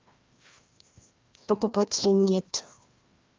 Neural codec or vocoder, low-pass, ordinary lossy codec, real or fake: codec, 16 kHz, 1 kbps, FreqCodec, larger model; 7.2 kHz; Opus, 24 kbps; fake